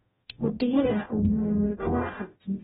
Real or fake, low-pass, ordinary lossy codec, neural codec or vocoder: fake; 19.8 kHz; AAC, 16 kbps; codec, 44.1 kHz, 0.9 kbps, DAC